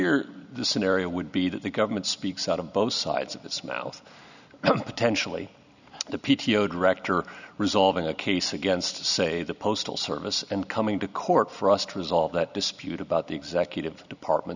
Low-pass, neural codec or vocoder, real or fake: 7.2 kHz; none; real